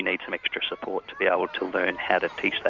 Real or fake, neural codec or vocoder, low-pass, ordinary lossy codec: real; none; 7.2 kHz; Opus, 64 kbps